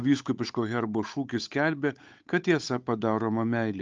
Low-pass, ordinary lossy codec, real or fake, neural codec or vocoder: 7.2 kHz; Opus, 24 kbps; fake; codec, 16 kHz, 8 kbps, FunCodec, trained on Chinese and English, 25 frames a second